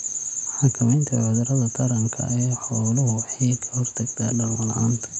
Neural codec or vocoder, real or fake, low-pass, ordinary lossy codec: none; real; 10.8 kHz; none